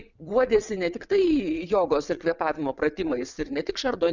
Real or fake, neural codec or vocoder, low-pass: fake; vocoder, 22.05 kHz, 80 mel bands, WaveNeXt; 7.2 kHz